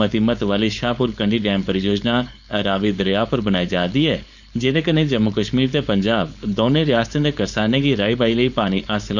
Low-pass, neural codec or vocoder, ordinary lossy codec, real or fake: 7.2 kHz; codec, 16 kHz, 4.8 kbps, FACodec; none; fake